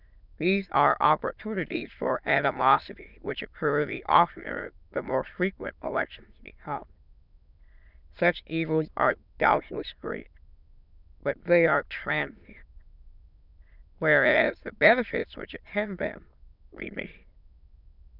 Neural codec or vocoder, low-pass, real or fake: autoencoder, 22.05 kHz, a latent of 192 numbers a frame, VITS, trained on many speakers; 5.4 kHz; fake